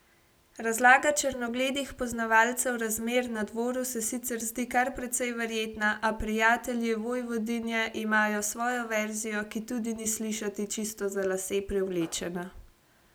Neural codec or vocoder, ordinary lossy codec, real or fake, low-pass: none; none; real; none